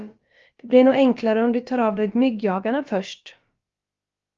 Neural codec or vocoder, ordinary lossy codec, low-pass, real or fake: codec, 16 kHz, about 1 kbps, DyCAST, with the encoder's durations; Opus, 32 kbps; 7.2 kHz; fake